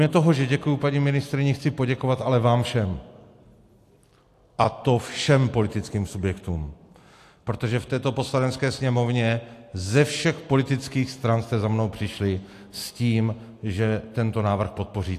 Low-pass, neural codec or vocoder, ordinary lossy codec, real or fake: 14.4 kHz; none; AAC, 64 kbps; real